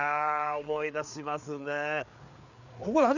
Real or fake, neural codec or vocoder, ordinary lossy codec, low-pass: fake; codec, 16 kHz, 4 kbps, FreqCodec, larger model; none; 7.2 kHz